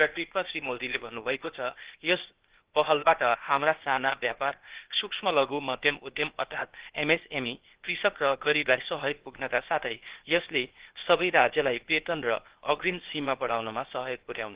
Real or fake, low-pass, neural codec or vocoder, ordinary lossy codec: fake; 3.6 kHz; codec, 16 kHz, 0.8 kbps, ZipCodec; Opus, 16 kbps